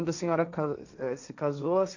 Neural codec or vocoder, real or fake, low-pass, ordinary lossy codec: codec, 16 kHz, 1.1 kbps, Voila-Tokenizer; fake; 7.2 kHz; none